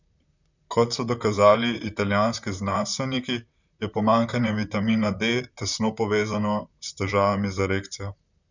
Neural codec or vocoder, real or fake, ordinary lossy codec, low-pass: vocoder, 44.1 kHz, 128 mel bands, Pupu-Vocoder; fake; none; 7.2 kHz